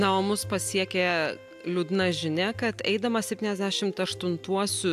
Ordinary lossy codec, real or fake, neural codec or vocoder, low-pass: AAC, 96 kbps; real; none; 14.4 kHz